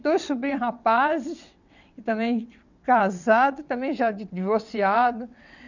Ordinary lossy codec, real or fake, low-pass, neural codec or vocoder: none; fake; 7.2 kHz; vocoder, 22.05 kHz, 80 mel bands, WaveNeXt